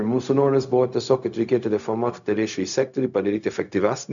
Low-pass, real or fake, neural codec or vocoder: 7.2 kHz; fake; codec, 16 kHz, 0.4 kbps, LongCat-Audio-Codec